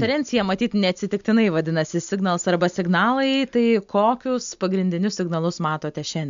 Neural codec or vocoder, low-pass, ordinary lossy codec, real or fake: none; 7.2 kHz; MP3, 64 kbps; real